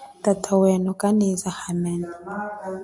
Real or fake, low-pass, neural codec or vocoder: real; 10.8 kHz; none